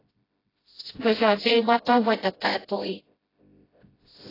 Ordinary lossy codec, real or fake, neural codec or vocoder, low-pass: AAC, 24 kbps; fake; codec, 16 kHz, 0.5 kbps, FreqCodec, smaller model; 5.4 kHz